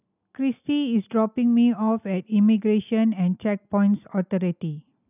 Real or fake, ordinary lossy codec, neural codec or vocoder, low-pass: real; none; none; 3.6 kHz